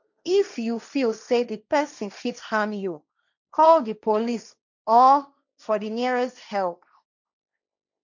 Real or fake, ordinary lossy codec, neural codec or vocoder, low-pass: fake; none; codec, 16 kHz, 1.1 kbps, Voila-Tokenizer; 7.2 kHz